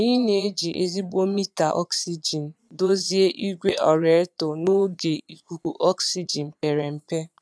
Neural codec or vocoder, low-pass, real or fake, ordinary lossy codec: vocoder, 22.05 kHz, 80 mel bands, Vocos; none; fake; none